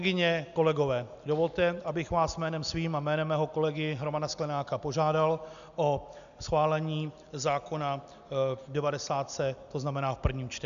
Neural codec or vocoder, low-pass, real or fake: none; 7.2 kHz; real